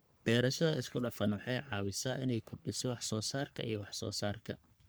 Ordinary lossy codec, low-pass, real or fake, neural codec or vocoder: none; none; fake; codec, 44.1 kHz, 3.4 kbps, Pupu-Codec